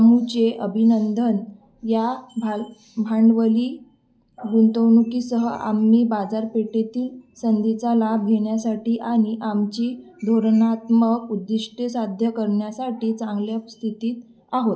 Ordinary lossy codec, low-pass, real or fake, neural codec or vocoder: none; none; real; none